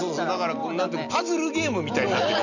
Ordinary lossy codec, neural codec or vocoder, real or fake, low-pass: none; none; real; 7.2 kHz